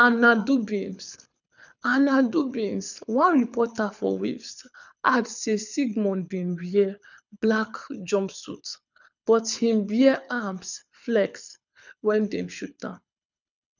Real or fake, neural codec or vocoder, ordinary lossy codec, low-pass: fake; codec, 24 kHz, 6 kbps, HILCodec; none; 7.2 kHz